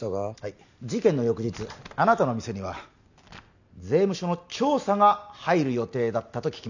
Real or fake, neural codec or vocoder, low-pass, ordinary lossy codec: real; none; 7.2 kHz; none